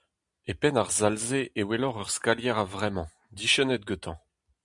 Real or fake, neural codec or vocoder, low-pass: real; none; 10.8 kHz